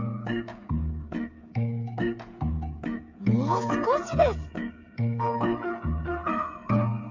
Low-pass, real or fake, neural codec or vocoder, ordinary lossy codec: 7.2 kHz; fake; codec, 16 kHz, 8 kbps, FreqCodec, smaller model; MP3, 64 kbps